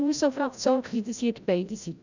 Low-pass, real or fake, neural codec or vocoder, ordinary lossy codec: 7.2 kHz; fake; codec, 16 kHz, 0.5 kbps, FreqCodec, larger model; none